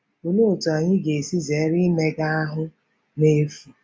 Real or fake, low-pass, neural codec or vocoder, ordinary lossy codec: real; none; none; none